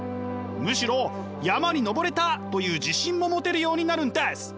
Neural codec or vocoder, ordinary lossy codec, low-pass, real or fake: none; none; none; real